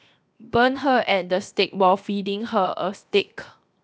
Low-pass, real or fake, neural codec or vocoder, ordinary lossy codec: none; fake; codec, 16 kHz, 0.7 kbps, FocalCodec; none